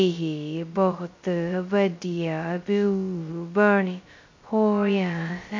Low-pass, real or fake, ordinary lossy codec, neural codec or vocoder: 7.2 kHz; fake; MP3, 48 kbps; codec, 16 kHz, 0.2 kbps, FocalCodec